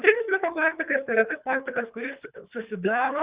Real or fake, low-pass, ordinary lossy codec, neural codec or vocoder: fake; 3.6 kHz; Opus, 64 kbps; codec, 24 kHz, 3 kbps, HILCodec